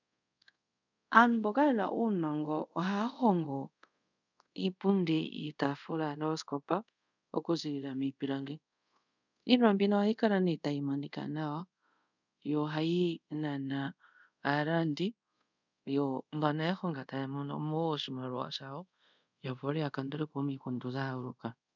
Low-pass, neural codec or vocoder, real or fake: 7.2 kHz; codec, 24 kHz, 0.5 kbps, DualCodec; fake